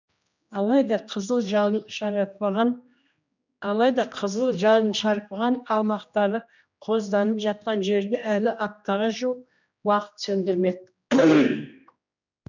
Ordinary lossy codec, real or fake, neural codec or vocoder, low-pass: none; fake; codec, 16 kHz, 1 kbps, X-Codec, HuBERT features, trained on general audio; 7.2 kHz